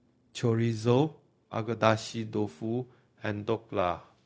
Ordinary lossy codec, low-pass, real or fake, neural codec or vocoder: none; none; fake; codec, 16 kHz, 0.4 kbps, LongCat-Audio-Codec